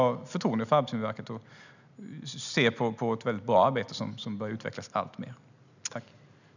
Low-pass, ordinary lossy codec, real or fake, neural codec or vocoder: 7.2 kHz; none; real; none